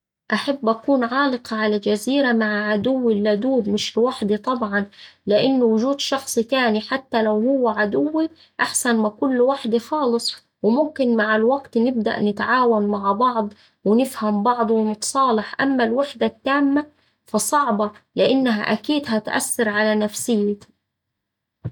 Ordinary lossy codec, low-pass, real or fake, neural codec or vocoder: none; 19.8 kHz; real; none